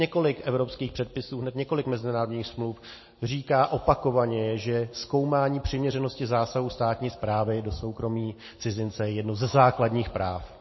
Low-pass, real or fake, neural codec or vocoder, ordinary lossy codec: 7.2 kHz; real; none; MP3, 24 kbps